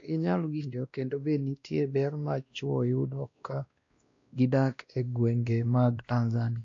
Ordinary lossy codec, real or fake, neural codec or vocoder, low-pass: AAC, 48 kbps; fake; codec, 16 kHz, 1 kbps, X-Codec, WavLM features, trained on Multilingual LibriSpeech; 7.2 kHz